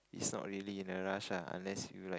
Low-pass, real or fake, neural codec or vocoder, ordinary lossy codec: none; real; none; none